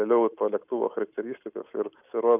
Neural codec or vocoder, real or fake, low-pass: none; real; 3.6 kHz